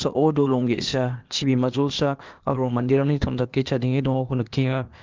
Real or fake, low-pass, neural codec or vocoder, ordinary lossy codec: fake; 7.2 kHz; codec, 16 kHz, 0.8 kbps, ZipCodec; Opus, 24 kbps